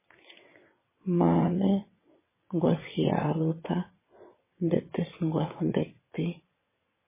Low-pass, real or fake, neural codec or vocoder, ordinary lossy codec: 3.6 kHz; fake; vocoder, 22.05 kHz, 80 mel bands, WaveNeXt; MP3, 16 kbps